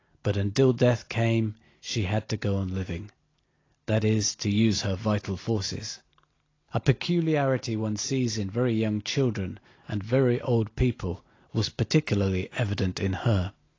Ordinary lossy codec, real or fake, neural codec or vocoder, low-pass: AAC, 32 kbps; real; none; 7.2 kHz